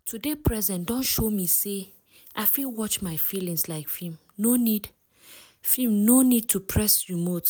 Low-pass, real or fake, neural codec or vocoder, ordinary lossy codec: none; real; none; none